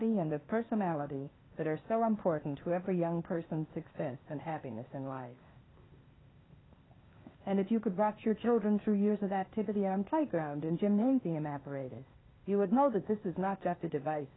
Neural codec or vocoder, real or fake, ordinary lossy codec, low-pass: codec, 16 kHz, 0.8 kbps, ZipCodec; fake; AAC, 16 kbps; 7.2 kHz